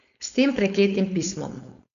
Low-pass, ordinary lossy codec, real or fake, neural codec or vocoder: 7.2 kHz; none; fake; codec, 16 kHz, 4.8 kbps, FACodec